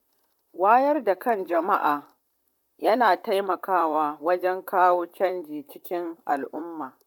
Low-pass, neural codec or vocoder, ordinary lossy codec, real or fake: 19.8 kHz; vocoder, 44.1 kHz, 128 mel bands, Pupu-Vocoder; none; fake